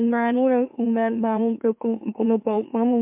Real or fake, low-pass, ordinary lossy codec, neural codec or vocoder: fake; 3.6 kHz; MP3, 32 kbps; autoencoder, 44.1 kHz, a latent of 192 numbers a frame, MeloTTS